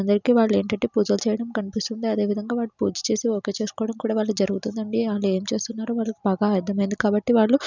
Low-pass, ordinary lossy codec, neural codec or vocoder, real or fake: 7.2 kHz; none; none; real